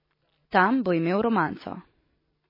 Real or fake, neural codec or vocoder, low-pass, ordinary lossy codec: real; none; 5.4 kHz; MP3, 24 kbps